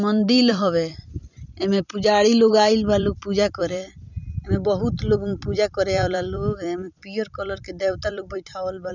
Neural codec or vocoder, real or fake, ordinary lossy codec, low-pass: vocoder, 44.1 kHz, 128 mel bands every 256 samples, BigVGAN v2; fake; none; 7.2 kHz